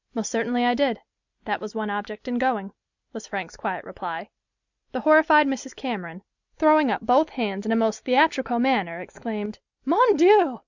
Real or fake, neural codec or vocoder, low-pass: real; none; 7.2 kHz